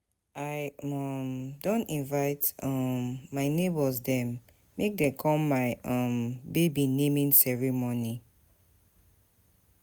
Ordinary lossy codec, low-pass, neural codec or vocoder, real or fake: none; none; none; real